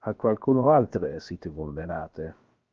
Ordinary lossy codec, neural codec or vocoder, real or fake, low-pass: Opus, 24 kbps; codec, 16 kHz, about 1 kbps, DyCAST, with the encoder's durations; fake; 7.2 kHz